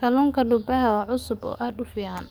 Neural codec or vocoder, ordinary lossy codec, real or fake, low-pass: codec, 44.1 kHz, 7.8 kbps, DAC; none; fake; none